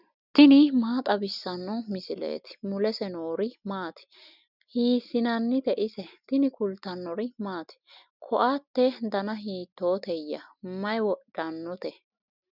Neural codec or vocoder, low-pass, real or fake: none; 5.4 kHz; real